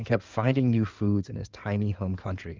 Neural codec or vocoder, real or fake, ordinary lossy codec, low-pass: codec, 16 kHz in and 24 kHz out, 2.2 kbps, FireRedTTS-2 codec; fake; Opus, 24 kbps; 7.2 kHz